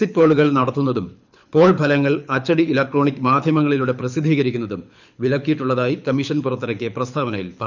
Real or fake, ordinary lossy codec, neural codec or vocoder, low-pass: fake; none; codec, 24 kHz, 6 kbps, HILCodec; 7.2 kHz